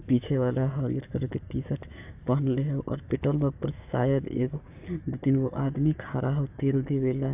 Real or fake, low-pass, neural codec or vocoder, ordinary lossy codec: fake; 3.6 kHz; codec, 16 kHz, 6 kbps, DAC; AAC, 32 kbps